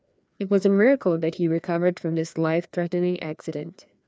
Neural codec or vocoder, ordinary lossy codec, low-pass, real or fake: codec, 16 kHz, 2 kbps, FreqCodec, larger model; none; none; fake